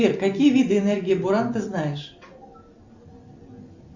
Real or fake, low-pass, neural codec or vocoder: real; 7.2 kHz; none